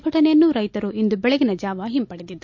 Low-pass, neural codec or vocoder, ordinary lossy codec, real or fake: 7.2 kHz; none; MP3, 48 kbps; real